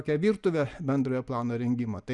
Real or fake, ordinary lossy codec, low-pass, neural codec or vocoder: real; Opus, 64 kbps; 10.8 kHz; none